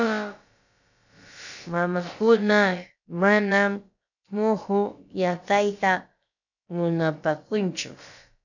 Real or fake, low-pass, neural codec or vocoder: fake; 7.2 kHz; codec, 16 kHz, about 1 kbps, DyCAST, with the encoder's durations